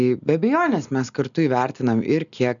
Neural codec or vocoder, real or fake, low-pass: none; real; 7.2 kHz